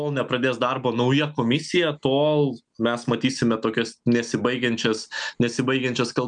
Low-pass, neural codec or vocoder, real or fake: 9.9 kHz; none; real